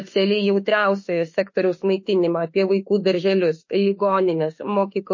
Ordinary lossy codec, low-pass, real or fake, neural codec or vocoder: MP3, 32 kbps; 7.2 kHz; fake; autoencoder, 48 kHz, 32 numbers a frame, DAC-VAE, trained on Japanese speech